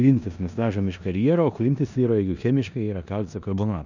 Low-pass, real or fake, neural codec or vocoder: 7.2 kHz; fake; codec, 16 kHz in and 24 kHz out, 0.9 kbps, LongCat-Audio-Codec, four codebook decoder